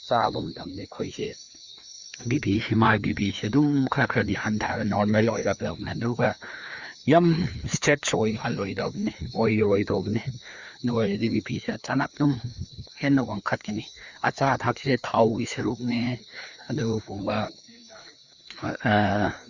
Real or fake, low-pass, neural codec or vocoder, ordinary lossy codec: fake; 7.2 kHz; codec, 16 kHz, 2 kbps, FreqCodec, larger model; Opus, 64 kbps